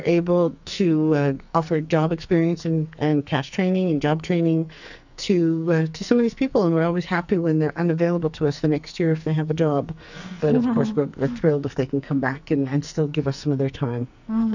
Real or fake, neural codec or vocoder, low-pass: fake; codec, 44.1 kHz, 2.6 kbps, SNAC; 7.2 kHz